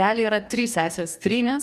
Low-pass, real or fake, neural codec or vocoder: 14.4 kHz; fake; codec, 44.1 kHz, 2.6 kbps, DAC